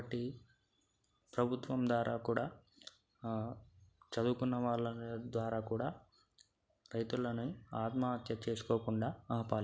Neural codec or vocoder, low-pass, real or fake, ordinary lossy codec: none; none; real; none